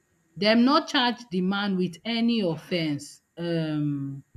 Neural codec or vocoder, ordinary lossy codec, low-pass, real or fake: none; none; 14.4 kHz; real